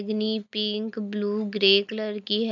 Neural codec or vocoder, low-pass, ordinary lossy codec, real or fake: none; 7.2 kHz; none; real